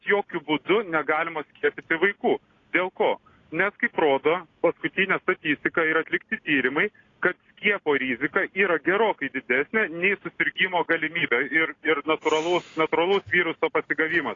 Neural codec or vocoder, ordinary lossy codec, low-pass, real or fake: none; AAC, 32 kbps; 7.2 kHz; real